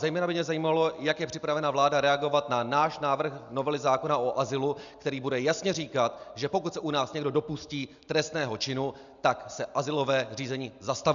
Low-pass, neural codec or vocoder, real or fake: 7.2 kHz; none; real